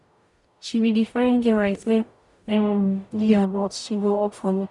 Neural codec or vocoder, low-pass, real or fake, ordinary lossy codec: codec, 44.1 kHz, 0.9 kbps, DAC; 10.8 kHz; fake; none